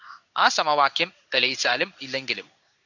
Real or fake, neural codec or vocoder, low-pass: fake; codec, 16 kHz in and 24 kHz out, 1 kbps, XY-Tokenizer; 7.2 kHz